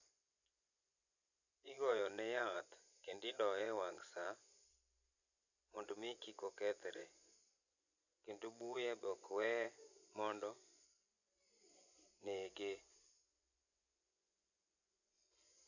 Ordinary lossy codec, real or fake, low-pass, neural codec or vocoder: none; fake; 7.2 kHz; vocoder, 24 kHz, 100 mel bands, Vocos